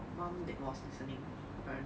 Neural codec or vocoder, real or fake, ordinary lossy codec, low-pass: none; real; none; none